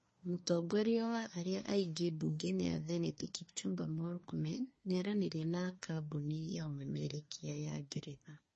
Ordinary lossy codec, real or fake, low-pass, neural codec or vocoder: MP3, 32 kbps; fake; 10.8 kHz; codec, 24 kHz, 1 kbps, SNAC